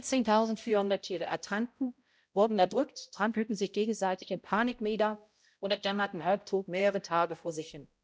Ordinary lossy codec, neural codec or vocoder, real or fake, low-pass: none; codec, 16 kHz, 0.5 kbps, X-Codec, HuBERT features, trained on balanced general audio; fake; none